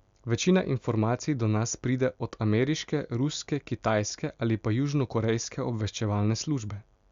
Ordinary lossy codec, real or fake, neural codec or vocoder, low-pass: Opus, 64 kbps; real; none; 7.2 kHz